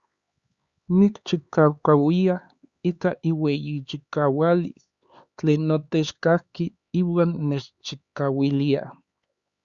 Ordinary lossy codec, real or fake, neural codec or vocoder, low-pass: Opus, 64 kbps; fake; codec, 16 kHz, 4 kbps, X-Codec, HuBERT features, trained on LibriSpeech; 7.2 kHz